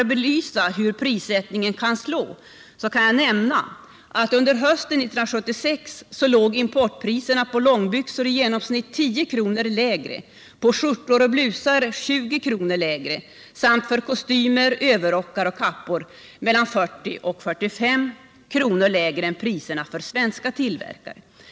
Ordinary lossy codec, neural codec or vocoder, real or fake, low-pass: none; none; real; none